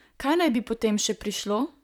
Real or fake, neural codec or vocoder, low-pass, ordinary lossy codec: fake; vocoder, 44.1 kHz, 128 mel bands, Pupu-Vocoder; 19.8 kHz; none